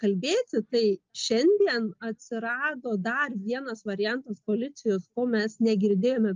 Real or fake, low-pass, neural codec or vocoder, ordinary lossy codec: real; 7.2 kHz; none; Opus, 24 kbps